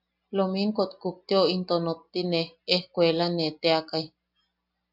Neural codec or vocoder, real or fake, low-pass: none; real; 5.4 kHz